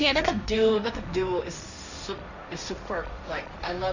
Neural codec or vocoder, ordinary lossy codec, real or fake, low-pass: codec, 16 kHz, 1.1 kbps, Voila-Tokenizer; none; fake; 7.2 kHz